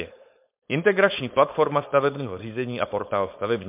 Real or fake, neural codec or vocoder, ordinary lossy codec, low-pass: fake; codec, 16 kHz, 4.8 kbps, FACodec; MP3, 32 kbps; 3.6 kHz